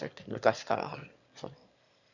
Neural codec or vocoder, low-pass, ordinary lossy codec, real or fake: autoencoder, 22.05 kHz, a latent of 192 numbers a frame, VITS, trained on one speaker; 7.2 kHz; none; fake